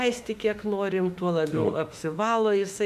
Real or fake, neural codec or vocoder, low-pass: fake; autoencoder, 48 kHz, 32 numbers a frame, DAC-VAE, trained on Japanese speech; 14.4 kHz